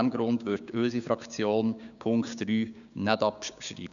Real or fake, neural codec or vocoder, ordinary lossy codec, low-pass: fake; codec, 16 kHz, 6 kbps, DAC; none; 7.2 kHz